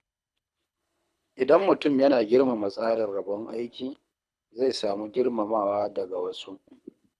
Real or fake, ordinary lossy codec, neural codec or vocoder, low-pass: fake; none; codec, 24 kHz, 3 kbps, HILCodec; none